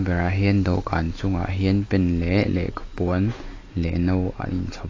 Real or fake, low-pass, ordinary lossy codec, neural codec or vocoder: real; 7.2 kHz; AAC, 32 kbps; none